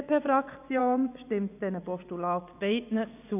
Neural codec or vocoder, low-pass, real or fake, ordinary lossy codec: vocoder, 24 kHz, 100 mel bands, Vocos; 3.6 kHz; fake; none